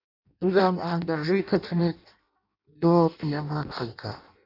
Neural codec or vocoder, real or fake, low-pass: codec, 16 kHz in and 24 kHz out, 0.6 kbps, FireRedTTS-2 codec; fake; 5.4 kHz